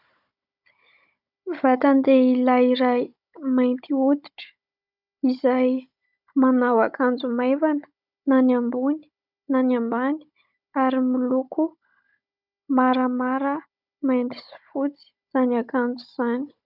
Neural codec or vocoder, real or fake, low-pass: codec, 16 kHz, 16 kbps, FunCodec, trained on Chinese and English, 50 frames a second; fake; 5.4 kHz